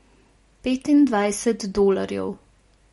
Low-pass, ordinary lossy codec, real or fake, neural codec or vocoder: 14.4 kHz; MP3, 48 kbps; real; none